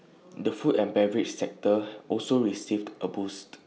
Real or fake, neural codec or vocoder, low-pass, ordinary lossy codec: real; none; none; none